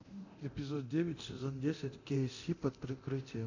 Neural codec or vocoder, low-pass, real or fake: codec, 24 kHz, 0.9 kbps, DualCodec; 7.2 kHz; fake